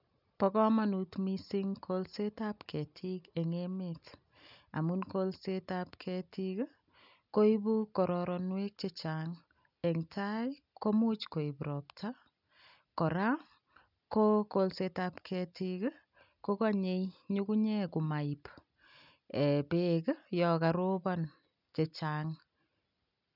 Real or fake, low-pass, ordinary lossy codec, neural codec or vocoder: real; 5.4 kHz; none; none